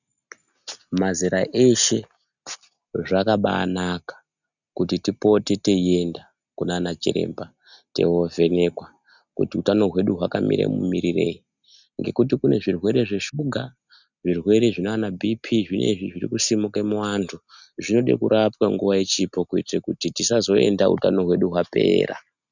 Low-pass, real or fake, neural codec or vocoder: 7.2 kHz; real; none